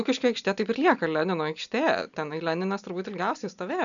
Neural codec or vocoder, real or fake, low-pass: none; real; 7.2 kHz